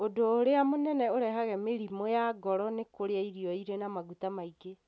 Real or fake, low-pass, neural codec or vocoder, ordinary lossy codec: real; none; none; none